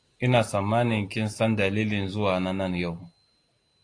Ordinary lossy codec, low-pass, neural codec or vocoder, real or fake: AAC, 48 kbps; 9.9 kHz; none; real